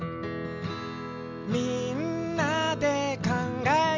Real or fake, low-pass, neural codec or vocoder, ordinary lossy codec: real; 7.2 kHz; none; MP3, 64 kbps